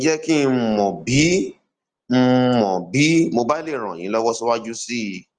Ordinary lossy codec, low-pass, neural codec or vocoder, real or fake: Opus, 24 kbps; 9.9 kHz; none; real